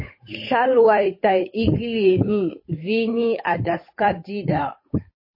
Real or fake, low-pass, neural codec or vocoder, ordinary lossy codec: fake; 5.4 kHz; codec, 16 kHz, 8 kbps, FunCodec, trained on Chinese and English, 25 frames a second; MP3, 24 kbps